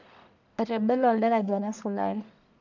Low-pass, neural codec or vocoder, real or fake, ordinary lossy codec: 7.2 kHz; codec, 44.1 kHz, 1.7 kbps, Pupu-Codec; fake; none